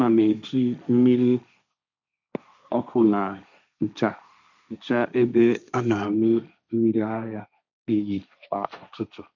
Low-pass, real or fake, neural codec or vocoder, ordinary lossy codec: 7.2 kHz; fake; codec, 16 kHz, 1.1 kbps, Voila-Tokenizer; none